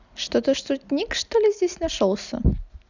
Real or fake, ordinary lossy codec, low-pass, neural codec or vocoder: real; none; 7.2 kHz; none